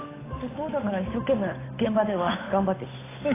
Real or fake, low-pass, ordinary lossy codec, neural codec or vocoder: fake; 3.6 kHz; AAC, 16 kbps; codec, 16 kHz, 8 kbps, FunCodec, trained on Chinese and English, 25 frames a second